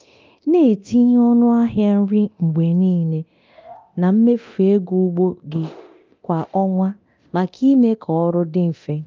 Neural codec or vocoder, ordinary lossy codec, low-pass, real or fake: codec, 24 kHz, 0.9 kbps, DualCodec; Opus, 24 kbps; 7.2 kHz; fake